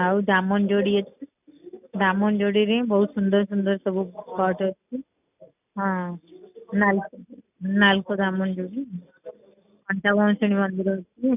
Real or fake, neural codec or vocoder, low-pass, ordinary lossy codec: real; none; 3.6 kHz; none